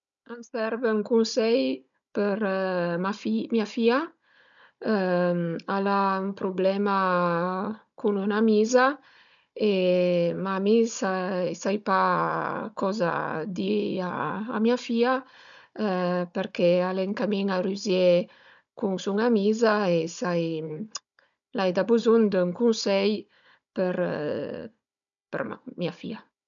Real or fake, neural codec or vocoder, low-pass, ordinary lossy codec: fake; codec, 16 kHz, 16 kbps, FunCodec, trained on Chinese and English, 50 frames a second; 7.2 kHz; none